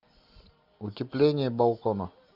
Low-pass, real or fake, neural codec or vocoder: 5.4 kHz; real; none